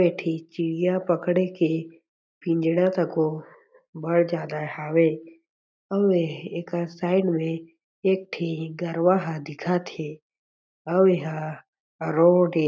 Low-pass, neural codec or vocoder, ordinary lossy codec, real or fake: none; none; none; real